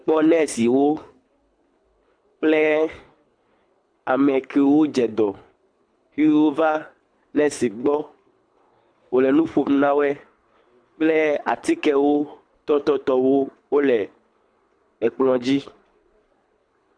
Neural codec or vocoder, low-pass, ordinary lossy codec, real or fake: codec, 24 kHz, 6 kbps, HILCodec; 9.9 kHz; AAC, 64 kbps; fake